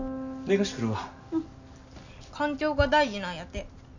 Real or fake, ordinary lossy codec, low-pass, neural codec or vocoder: real; none; 7.2 kHz; none